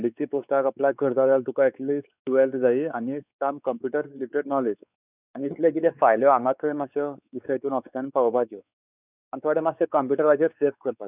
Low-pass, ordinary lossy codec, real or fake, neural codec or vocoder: 3.6 kHz; none; fake; codec, 16 kHz, 4 kbps, X-Codec, WavLM features, trained on Multilingual LibriSpeech